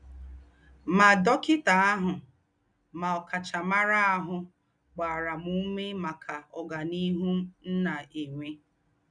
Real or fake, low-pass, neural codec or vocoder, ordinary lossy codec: real; 9.9 kHz; none; none